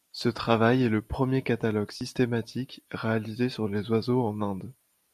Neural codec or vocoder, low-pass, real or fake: none; 14.4 kHz; real